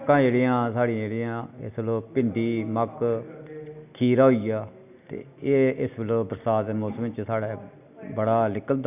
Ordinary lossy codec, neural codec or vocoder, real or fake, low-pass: none; none; real; 3.6 kHz